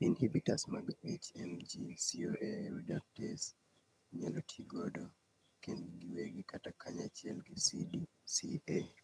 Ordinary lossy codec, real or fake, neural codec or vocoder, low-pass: none; fake; vocoder, 22.05 kHz, 80 mel bands, HiFi-GAN; none